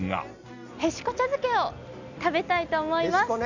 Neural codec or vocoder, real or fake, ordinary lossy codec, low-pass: none; real; none; 7.2 kHz